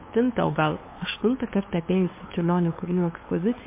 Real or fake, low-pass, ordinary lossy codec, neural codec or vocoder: fake; 3.6 kHz; MP3, 24 kbps; codec, 16 kHz, 2 kbps, FunCodec, trained on LibriTTS, 25 frames a second